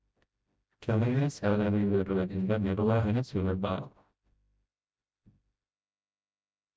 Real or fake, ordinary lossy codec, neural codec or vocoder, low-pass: fake; none; codec, 16 kHz, 0.5 kbps, FreqCodec, smaller model; none